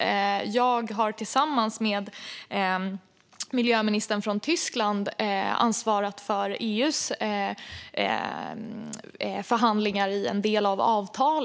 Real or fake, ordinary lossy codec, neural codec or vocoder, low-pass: real; none; none; none